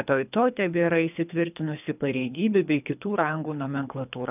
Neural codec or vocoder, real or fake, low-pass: codec, 24 kHz, 3 kbps, HILCodec; fake; 3.6 kHz